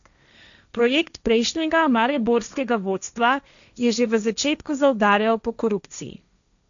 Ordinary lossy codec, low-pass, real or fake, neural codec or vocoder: none; 7.2 kHz; fake; codec, 16 kHz, 1.1 kbps, Voila-Tokenizer